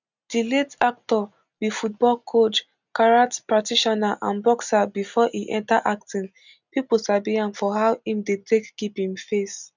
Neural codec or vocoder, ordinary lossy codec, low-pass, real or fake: none; none; 7.2 kHz; real